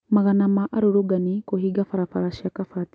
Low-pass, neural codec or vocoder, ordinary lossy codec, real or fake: none; none; none; real